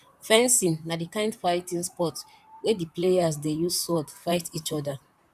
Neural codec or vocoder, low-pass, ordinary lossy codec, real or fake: vocoder, 44.1 kHz, 128 mel bands, Pupu-Vocoder; 14.4 kHz; none; fake